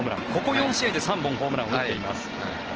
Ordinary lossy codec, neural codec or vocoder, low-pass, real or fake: Opus, 16 kbps; none; 7.2 kHz; real